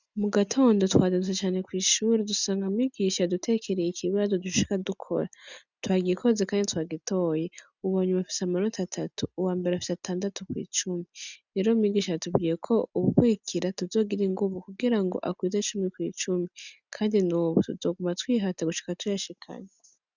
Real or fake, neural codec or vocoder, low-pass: real; none; 7.2 kHz